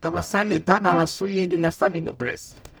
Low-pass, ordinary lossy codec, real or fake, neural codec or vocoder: none; none; fake; codec, 44.1 kHz, 0.9 kbps, DAC